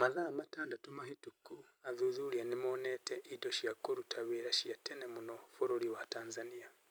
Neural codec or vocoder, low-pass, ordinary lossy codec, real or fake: vocoder, 44.1 kHz, 128 mel bands every 256 samples, BigVGAN v2; none; none; fake